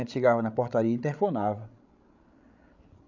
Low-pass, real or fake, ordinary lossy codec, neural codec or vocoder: 7.2 kHz; fake; none; codec, 16 kHz, 16 kbps, FreqCodec, larger model